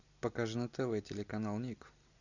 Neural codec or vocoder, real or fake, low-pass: none; real; 7.2 kHz